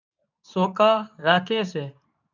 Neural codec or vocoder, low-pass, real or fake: codec, 24 kHz, 0.9 kbps, WavTokenizer, medium speech release version 2; 7.2 kHz; fake